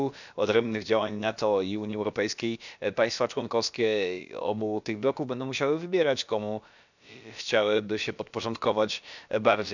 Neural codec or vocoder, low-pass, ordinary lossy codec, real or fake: codec, 16 kHz, about 1 kbps, DyCAST, with the encoder's durations; 7.2 kHz; none; fake